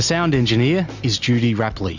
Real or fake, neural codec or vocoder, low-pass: real; none; 7.2 kHz